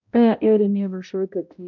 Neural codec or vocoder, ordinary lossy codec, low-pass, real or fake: codec, 16 kHz, 0.5 kbps, X-Codec, HuBERT features, trained on balanced general audio; MP3, 64 kbps; 7.2 kHz; fake